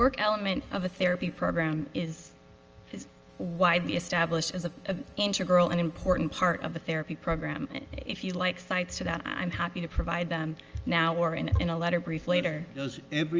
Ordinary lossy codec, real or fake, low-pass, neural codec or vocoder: Opus, 24 kbps; real; 7.2 kHz; none